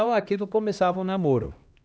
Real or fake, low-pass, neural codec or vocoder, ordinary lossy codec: fake; none; codec, 16 kHz, 1 kbps, X-Codec, HuBERT features, trained on LibriSpeech; none